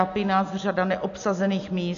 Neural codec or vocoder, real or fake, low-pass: none; real; 7.2 kHz